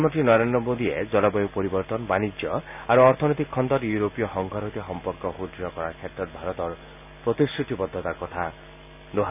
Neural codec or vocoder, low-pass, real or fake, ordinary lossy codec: none; 3.6 kHz; real; none